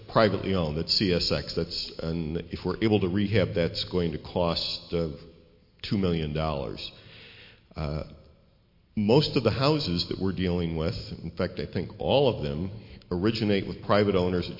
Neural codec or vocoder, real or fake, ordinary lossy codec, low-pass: none; real; MP3, 32 kbps; 5.4 kHz